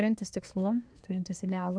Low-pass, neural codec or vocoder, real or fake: 9.9 kHz; codec, 24 kHz, 1 kbps, SNAC; fake